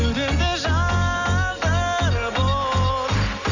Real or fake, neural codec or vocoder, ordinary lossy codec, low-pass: real; none; none; 7.2 kHz